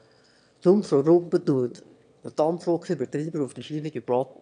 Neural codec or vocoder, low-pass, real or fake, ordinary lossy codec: autoencoder, 22.05 kHz, a latent of 192 numbers a frame, VITS, trained on one speaker; 9.9 kHz; fake; none